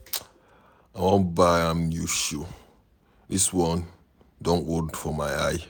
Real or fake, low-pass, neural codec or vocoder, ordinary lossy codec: real; none; none; none